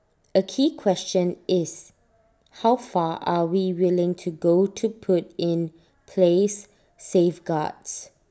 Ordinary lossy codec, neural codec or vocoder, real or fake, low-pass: none; none; real; none